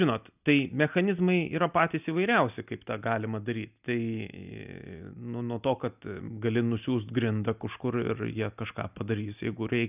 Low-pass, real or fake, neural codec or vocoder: 3.6 kHz; real; none